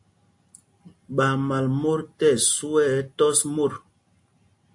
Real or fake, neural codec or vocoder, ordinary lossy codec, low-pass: real; none; AAC, 64 kbps; 10.8 kHz